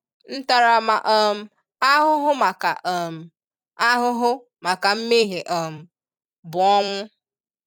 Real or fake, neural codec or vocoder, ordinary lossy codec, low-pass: fake; vocoder, 44.1 kHz, 128 mel bands every 256 samples, BigVGAN v2; none; 19.8 kHz